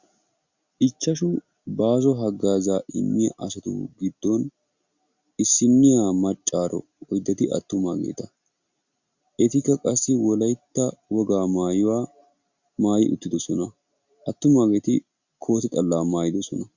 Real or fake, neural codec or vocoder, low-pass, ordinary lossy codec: real; none; 7.2 kHz; Opus, 64 kbps